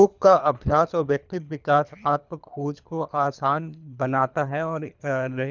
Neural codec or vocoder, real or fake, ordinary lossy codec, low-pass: codec, 24 kHz, 3 kbps, HILCodec; fake; none; 7.2 kHz